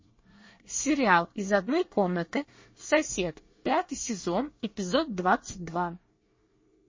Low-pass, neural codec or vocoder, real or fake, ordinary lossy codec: 7.2 kHz; codec, 24 kHz, 1 kbps, SNAC; fake; MP3, 32 kbps